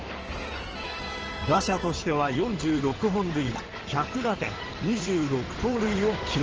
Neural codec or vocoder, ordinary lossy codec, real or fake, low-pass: codec, 16 kHz in and 24 kHz out, 2.2 kbps, FireRedTTS-2 codec; Opus, 16 kbps; fake; 7.2 kHz